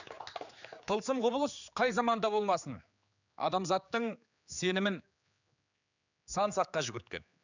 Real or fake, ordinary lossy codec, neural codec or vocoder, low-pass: fake; none; codec, 16 kHz, 4 kbps, X-Codec, HuBERT features, trained on general audio; 7.2 kHz